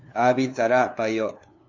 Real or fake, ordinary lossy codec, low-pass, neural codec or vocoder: fake; MP3, 64 kbps; 7.2 kHz; codec, 16 kHz, 2 kbps, FunCodec, trained on LibriTTS, 25 frames a second